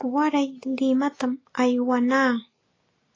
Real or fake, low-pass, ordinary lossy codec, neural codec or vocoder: real; 7.2 kHz; AAC, 32 kbps; none